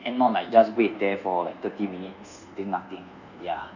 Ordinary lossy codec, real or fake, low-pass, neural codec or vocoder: none; fake; 7.2 kHz; codec, 24 kHz, 1.2 kbps, DualCodec